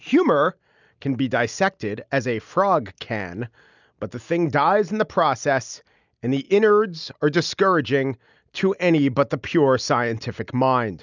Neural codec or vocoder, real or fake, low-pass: none; real; 7.2 kHz